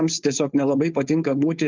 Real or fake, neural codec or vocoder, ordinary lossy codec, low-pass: fake; codec, 16 kHz, 4.8 kbps, FACodec; Opus, 24 kbps; 7.2 kHz